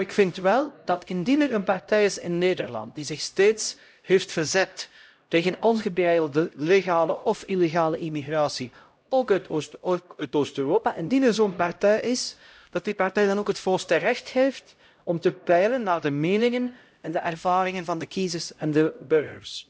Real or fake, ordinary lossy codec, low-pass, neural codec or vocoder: fake; none; none; codec, 16 kHz, 0.5 kbps, X-Codec, HuBERT features, trained on LibriSpeech